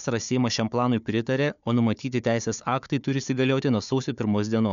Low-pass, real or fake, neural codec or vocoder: 7.2 kHz; fake; codec, 16 kHz, 4 kbps, FunCodec, trained on Chinese and English, 50 frames a second